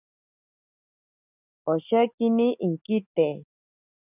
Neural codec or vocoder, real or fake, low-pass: none; real; 3.6 kHz